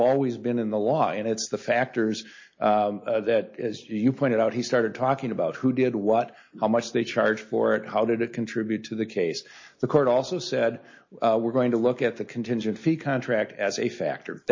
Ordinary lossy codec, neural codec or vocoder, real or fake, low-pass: MP3, 32 kbps; none; real; 7.2 kHz